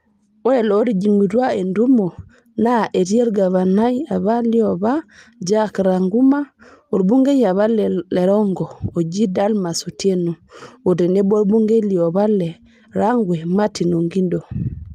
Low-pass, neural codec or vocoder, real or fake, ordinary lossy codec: 14.4 kHz; none; real; Opus, 32 kbps